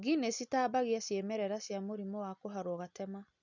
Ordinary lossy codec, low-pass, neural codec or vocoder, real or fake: none; 7.2 kHz; none; real